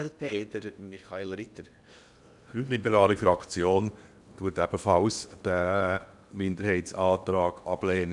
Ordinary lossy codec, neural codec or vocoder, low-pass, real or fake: none; codec, 16 kHz in and 24 kHz out, 0.8 kbps, FocalCodec, streaming, 65536 codes; 10.8 kHz; fake